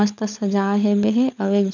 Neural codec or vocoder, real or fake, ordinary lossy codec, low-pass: codec, 16 kHz, 16 kbps, FunCodec, trained on LibriTTS, 50 frames a second; fake; none; 7.2 kHz